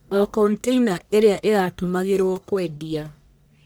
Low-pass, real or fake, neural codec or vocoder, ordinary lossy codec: none; fake; codec, 44.1 kHz, 1.7 kbps, Pupu-Codec; none